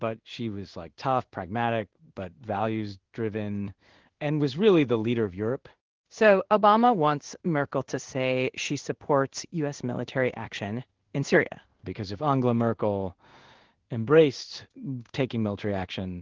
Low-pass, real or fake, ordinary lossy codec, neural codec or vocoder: 7.2 kHz; fake; Opus, 16 kbps; codec, 16 kHz in and 24 kHz out, 1 kbps, XY-Tokenizer